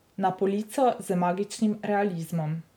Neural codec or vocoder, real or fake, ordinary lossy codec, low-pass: none; real; none; none